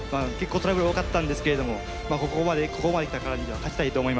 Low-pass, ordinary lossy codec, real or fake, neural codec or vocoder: none; none; real; none